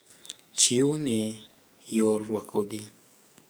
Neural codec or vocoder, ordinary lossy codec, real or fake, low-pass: codec, 44.1 kHz, 2.6 kbps, SNAC; none; fake; none